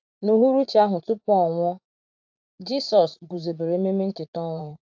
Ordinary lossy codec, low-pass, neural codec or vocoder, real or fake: AAC, 48 kbps; 7.2 kHz; vocoder, 44.1 kHz, 80 mel bands, Vocos; fake